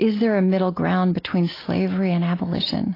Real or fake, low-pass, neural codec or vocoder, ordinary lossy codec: real; 5.4 kHz; none; AAC, 24 kbps